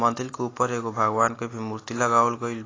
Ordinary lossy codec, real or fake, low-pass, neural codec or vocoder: AAC, 32 kbps; real; 7.2 kHz; none